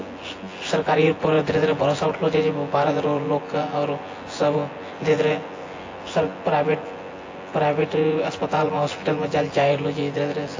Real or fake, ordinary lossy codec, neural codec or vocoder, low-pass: fake; AAC, 32 kbps; vocoder, 24 kHz, 100 mel bands, Vocos; 7.2 kHz